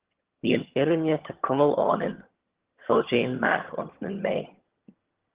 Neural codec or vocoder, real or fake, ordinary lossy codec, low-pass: vocoder, 22.05 kHz, 80 mel bands, HiFi-GAN; fake; Opus, 16 kbps; 3.6 kHz